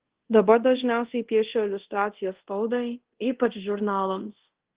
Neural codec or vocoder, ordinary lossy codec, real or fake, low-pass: codec, 24 kHz, 0.5 kbps, DualCodec; Opus, 16 kbps; fake; 3.6 kHz